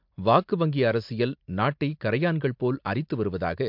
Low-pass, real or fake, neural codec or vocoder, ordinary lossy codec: 5.4 kHz; real; none; MP3, 48 kbps